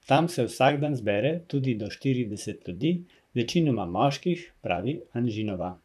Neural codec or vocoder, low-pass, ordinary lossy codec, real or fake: vocoder, 44.1 kHz, 128 mel bands, Pupu-Vocoder; 14.4 kHz; none; fake